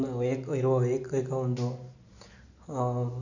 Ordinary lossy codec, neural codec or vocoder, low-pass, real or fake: none; none; 7.2 kHz; real